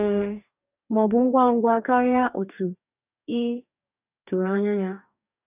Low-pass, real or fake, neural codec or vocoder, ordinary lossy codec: 3.6 kHz; fake; codec, 44.1 kHz, 2.6 kbps, DAC; none